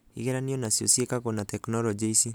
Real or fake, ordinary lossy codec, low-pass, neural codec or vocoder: real; none; none; none